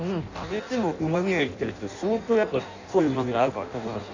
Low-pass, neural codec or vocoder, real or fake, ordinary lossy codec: 7.2 kHz; codec, 16 kHz in and 24 kHz out, 0.6 kbps, FireRedTTS-2 codec; fake; Opus, 64 kbps